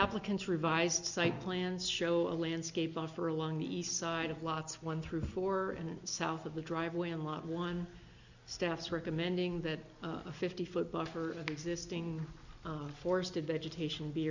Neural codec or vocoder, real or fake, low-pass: none; real; 7.2 kHz